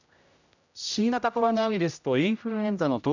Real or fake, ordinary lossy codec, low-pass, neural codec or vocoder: fake; none; 7.2 kHz; codec, 16 kHz, 0.5 kbps, X-Codec, HuBERT features, trained on general audio